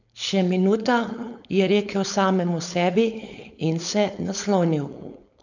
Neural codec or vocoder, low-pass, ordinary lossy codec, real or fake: codec, 16 kHz, 4.8 kbps, FACodec; 7.2 kHz; none; fake